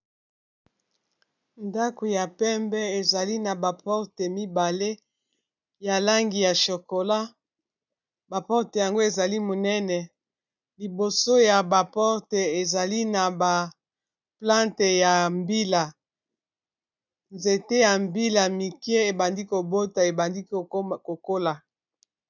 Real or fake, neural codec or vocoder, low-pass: real; none; 7.2 kHz